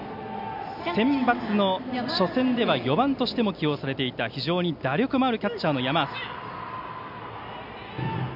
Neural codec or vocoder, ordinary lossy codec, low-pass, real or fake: none; none; 5.4 kHz; real